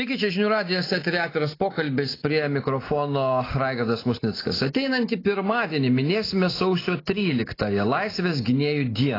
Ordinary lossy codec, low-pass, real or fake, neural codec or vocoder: AAC, 24 kbps; 5.4 kHz; real; none